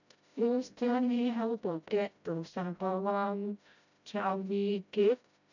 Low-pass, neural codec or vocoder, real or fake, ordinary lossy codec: 7.2 kHz; codec, 16 kHz, 0.5 kbps, FreqCodec, smaller model; fake; none